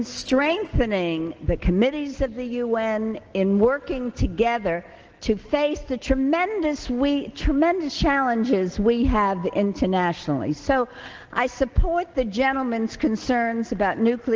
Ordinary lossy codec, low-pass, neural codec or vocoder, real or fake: Opus, 16 kbps; 7.2 kHz; none; real